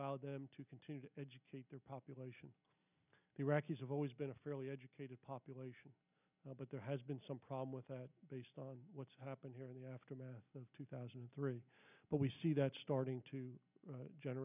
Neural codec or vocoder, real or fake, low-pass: none; real; 3.6 kHz